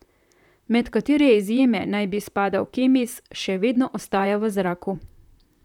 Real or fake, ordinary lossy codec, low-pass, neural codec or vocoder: fake; none; 19.8 kHz; vocoder, 44.1 kHz, 128 mel bands, Pupu-Vocoder